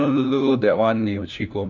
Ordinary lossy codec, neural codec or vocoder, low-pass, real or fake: none; codec, 16 kHz, 1 kbps, FunCodec, trained on LibriTTS, 50 frames a second; 7.2 kHz; fake